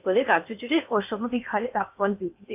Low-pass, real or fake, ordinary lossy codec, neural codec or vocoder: 3.6 kHz; fake; AAC, 32 kbps; codec, 16 kHz in and 24 kHz out, 0.8 kbps, FocalCodec, streaming, 65536 codes